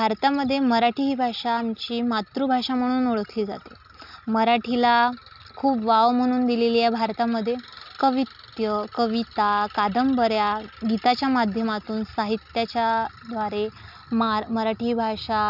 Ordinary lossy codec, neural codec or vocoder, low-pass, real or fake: none; none; 5.4 kHz; real